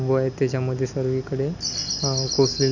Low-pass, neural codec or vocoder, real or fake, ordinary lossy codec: 7.2 kHz; none; real; none